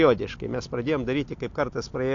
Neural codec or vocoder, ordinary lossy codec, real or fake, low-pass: none; AAC, 48 kbps; real; 7.2 kHz